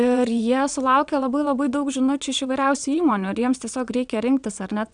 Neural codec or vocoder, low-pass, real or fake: vocoder, 22.05 kHz, 80 mel bands, WaveNeXt; 9.9 kHz; fake